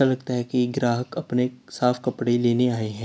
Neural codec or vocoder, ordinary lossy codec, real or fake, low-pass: none; none; real; none